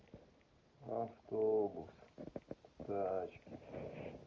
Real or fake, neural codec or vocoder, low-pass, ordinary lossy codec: real; none; 7.2 kHz; Opus, 32 kbps